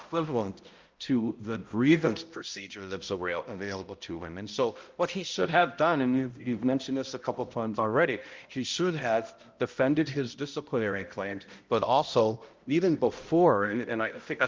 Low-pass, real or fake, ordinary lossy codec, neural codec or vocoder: 7.2 kHz; fake; Opus, 32 kbps; codec, 16 kHz, 0.5 kbps, X-Codec, HuBERT features, trained on balanced general audio